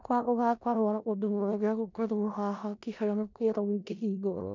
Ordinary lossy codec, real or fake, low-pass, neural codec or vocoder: none; fake; 7.2 kHz; codec, 16 kHz in and 24 kHz out, 0.4 kbps, LongCat-Audio-Codec, four codebook decoder